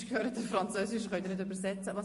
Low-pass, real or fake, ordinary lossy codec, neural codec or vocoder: 14.4 kHz; fake; MP3, 48 kbps; vocoder, 44.1 kHz, 128 mel bands every 512 samples, BigVGAN v2